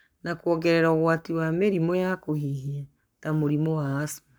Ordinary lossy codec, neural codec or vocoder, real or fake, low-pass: none; codec, 44.1 kHz, 7.8 kbps, Pupu-Codec; fake; none